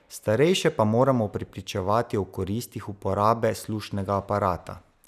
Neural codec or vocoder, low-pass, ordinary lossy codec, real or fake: none; 14.4 kHz; none; real